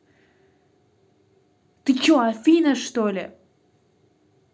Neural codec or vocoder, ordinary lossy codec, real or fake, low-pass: none; none; real; none